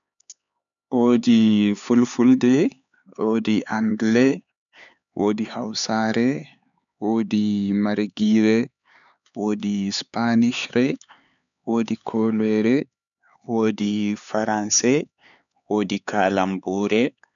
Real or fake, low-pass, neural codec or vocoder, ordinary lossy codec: fake; 7.2 kHz; codec, 16 kHz, 4 kbps, X-Codec, HuBERT features, trained on LibriSpeech; none